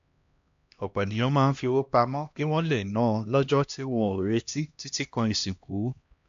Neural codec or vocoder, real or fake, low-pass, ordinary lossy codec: codec, 16 kHz, 1 kbps, X-Codec, HuBERT features, trained on LibriSpeech; fake; 7.2 kHz; AAC, 64 kbps